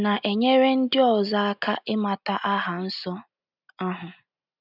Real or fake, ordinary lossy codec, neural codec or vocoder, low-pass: real; none; none; 5.4 kHz